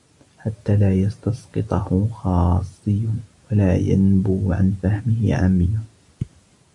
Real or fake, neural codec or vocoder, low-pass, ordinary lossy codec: real; none; 10.8 kHz; AAC, 64 kbps